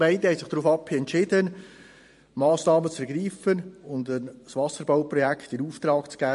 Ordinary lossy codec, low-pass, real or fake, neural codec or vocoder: MP3, 48 kbps; 14.4 kHz; fake; vocoder, 44.1 kHz, 128 mel bands every 512 samples, BigVGAN v2